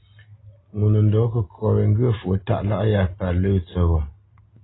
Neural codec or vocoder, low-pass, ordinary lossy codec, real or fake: none; 7.2 kHz; AAC, 16 kbps; real